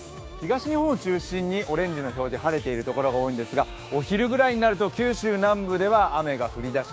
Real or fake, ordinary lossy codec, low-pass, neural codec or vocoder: fake; none; none; codec, 16 kHz, 6 kbps, DAC